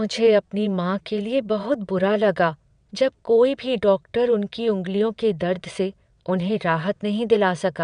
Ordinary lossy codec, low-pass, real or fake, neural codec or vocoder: none; 9.9 kHz; fake; vocoder, 22.05 kHz, 80 mel bands, WaveNeXt